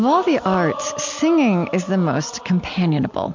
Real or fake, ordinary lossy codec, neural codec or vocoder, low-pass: real; MP3, 64 kbps; none; 7.2 kHz